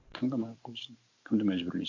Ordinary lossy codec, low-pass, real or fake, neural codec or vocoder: AAC, 48 kbps; 7.2 kHz; fake; vocoder, 44.1 kHz, 128 mel bands every 512 samples, BigVGAN v2